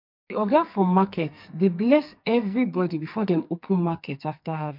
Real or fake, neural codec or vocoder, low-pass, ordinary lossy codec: fake; codec, 44.1 kHz, 2.6 kbps, SNAC; 5.4 kHz; MP3, 48 kbps